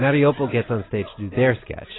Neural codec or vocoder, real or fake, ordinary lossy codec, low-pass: none; real; AAC, 16 kbps; 7.2 kHz